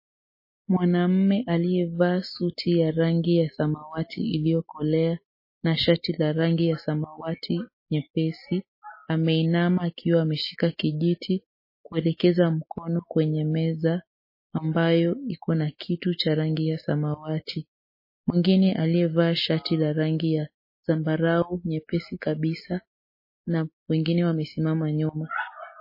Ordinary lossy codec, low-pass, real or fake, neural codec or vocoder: MP3, 24 kbps; 5.4 kHz; real; none